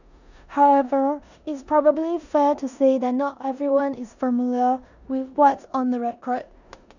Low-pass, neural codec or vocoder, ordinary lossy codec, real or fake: 7.2 kHz; codec, 16 kHz in and 24 kHz out, 0.9 kbps, LongCat-Audio-Codec, four codebook decoder; none; fake